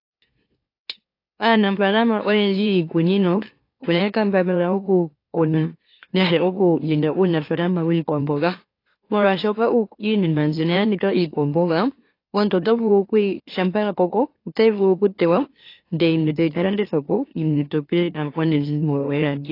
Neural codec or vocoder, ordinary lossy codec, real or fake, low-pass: autoencoder, 44.1 kHz, a latent of 192 numbers a frame, MeloTTS; AAC, 32 kbps; fake; 5.4 kHz